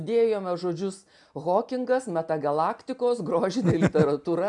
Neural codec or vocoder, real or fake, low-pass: none; real; 10.8 kHz